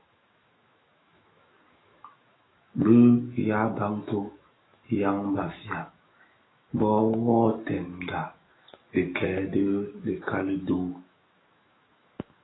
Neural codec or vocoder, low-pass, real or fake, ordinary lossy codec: autoencoder, 48 kHz, 128 numbers a frame, DAC-VAE, trained on Japanese speech; 7.2 kHz; fake; AAC, 16 kbps